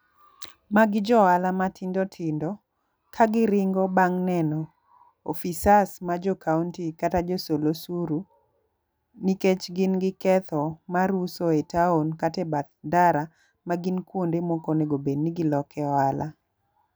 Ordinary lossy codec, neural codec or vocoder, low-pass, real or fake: none; none; none; real